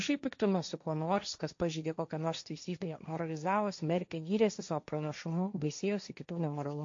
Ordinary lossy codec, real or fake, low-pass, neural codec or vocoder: MP3, 48 kbps; fake; 7.2 kHz; codec, 16 kHz, 1.1 kbps, Voila-Tokenizer